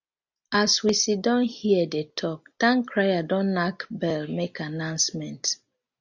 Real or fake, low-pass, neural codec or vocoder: real; 7.2 kHz; none